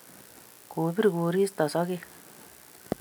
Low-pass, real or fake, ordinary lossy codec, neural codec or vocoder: none; real; none; none